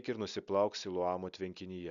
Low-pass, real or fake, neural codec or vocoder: 7.2 kHz; real; none